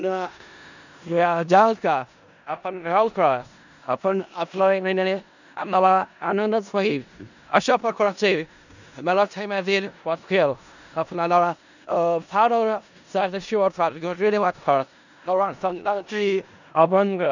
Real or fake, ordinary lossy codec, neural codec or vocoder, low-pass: fake; none; codec, 16 kHz in and 24 kHz out, 0.4 kbps, LongCat-Audio-Codec, four codebook decoder; 7.2 kHz